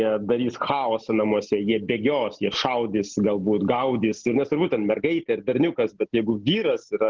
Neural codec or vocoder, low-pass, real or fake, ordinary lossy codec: none; 7.2 kHz; real; Opus, 16 kbps